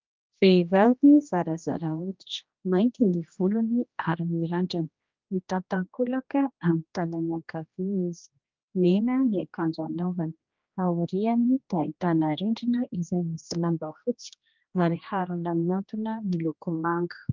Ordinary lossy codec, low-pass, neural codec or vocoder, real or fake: Opus, 24 kbps; 7.2 kHz; codec, 16 kHz, 1 kbps, X-Codec, HuBERT features, trained on general audio; fake